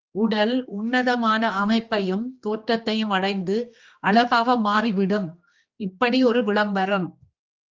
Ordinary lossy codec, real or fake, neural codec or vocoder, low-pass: Opus, 24 kbps; fake; codec, 16 kHz, 2 kbps, X-Codec, HuBERT features, trained on general audio; 7.2 kHz